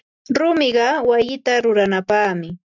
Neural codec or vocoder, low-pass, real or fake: none; 7.2 kHz; real